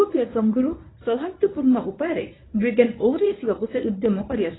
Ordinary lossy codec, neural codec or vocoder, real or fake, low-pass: AAC, 16 kbps; codec, 24 kHz, 0.9 kbps, WavTokenizer, medium speech release version 1; fake; 7.2 kHz